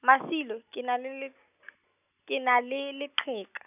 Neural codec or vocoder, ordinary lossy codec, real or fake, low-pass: none; none; real; 3.6 kHz